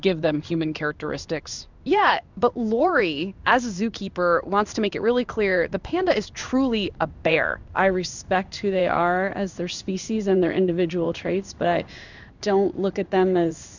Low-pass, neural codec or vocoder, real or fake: 7.2 kHz; codec, 16 kHz in and 24 kHz out, 1 kbps, XY-Tokenizer; fake